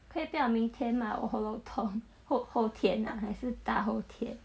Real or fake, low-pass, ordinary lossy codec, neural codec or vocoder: real; none; none; none